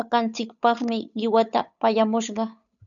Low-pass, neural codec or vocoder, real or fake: 7.2 kHz; codec, 16 kHz, 8 kbps, FunCodec, trained on Chinese and English, 25 frames a second; fake